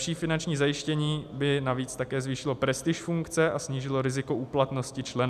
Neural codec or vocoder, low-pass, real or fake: none; 14.4 kHz; real